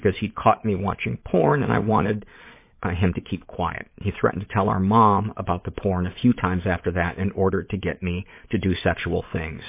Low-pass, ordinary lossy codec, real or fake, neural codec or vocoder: 3.6 kHz; MP3, 24 kbps; fake; vocoder, 22.05 kHz, 80 mel bands, Vocos